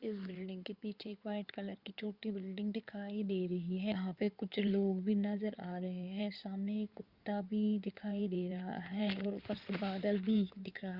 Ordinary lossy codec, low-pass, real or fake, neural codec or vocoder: Opus, 64 kbps; 5.4 kHz; fake; codec, 16 kHz in and 24 kHz out, 2.2 kbps, FireRedTTS-2 codec